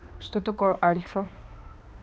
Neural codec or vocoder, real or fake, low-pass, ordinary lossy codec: codec, 16 kHz, 2 kbps, X-Codec, HuBERT features, trained on balanced general audio; fake; none; none